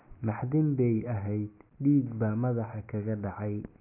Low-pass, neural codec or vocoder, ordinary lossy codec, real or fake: 3.6 kHz; none; none; real